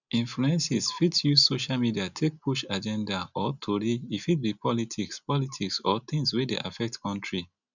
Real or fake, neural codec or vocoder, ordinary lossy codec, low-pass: real; none; none; 7.2 kHz